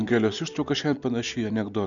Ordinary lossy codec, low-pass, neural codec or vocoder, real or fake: MP3, 96 kbps; 7.2 kHz; none; real